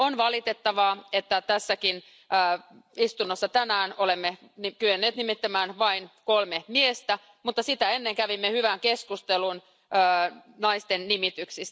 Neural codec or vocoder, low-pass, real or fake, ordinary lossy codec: none; none; real; none